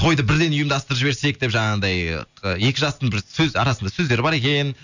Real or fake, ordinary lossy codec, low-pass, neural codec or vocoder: real; none; 7.2 kHz; none